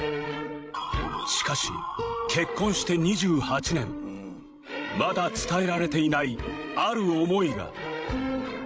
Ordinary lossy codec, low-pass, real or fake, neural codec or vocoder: none; none; fake; codec, 16 kHz, 16 kbps, FreqCodec, larger model